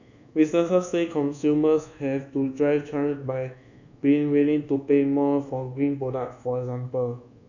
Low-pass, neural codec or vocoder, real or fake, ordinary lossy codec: 7.2 kHz; codec, 24 kHz, 1.2 kbps, DualCodec; fake; none